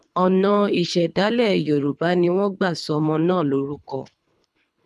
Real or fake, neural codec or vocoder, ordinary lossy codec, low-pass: fake; codec, 24 kHz, 3 kbps, HILCodec; none; none